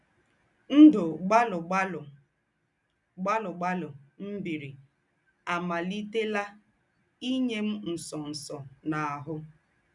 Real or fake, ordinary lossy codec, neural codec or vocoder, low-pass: real; none; none; 10.8 kHz